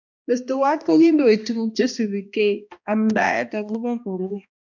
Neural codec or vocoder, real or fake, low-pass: codec, 16 kHz, 1 kbps, X-Codec, HuBERT features, trained on balanced general audio; fake; 7.2 kHz